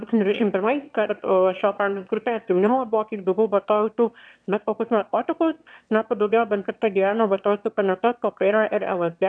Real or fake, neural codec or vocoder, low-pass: fake; autoencoder, 22.05 kHz, a latent of 192 numbers a frame, VITS, trained on one speaker; 9.9 kHz